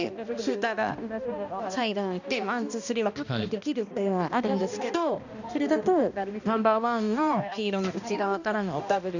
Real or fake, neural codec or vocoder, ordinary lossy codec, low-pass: fake; codec, 16 kHz, 1 kbps, X-Codec, HuBERT features, trained on balanced general audio; none; 7.2 kHz